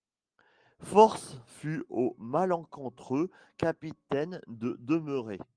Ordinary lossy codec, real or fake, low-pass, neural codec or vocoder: Opus, 24 kbps; real; 9.9 kHz; none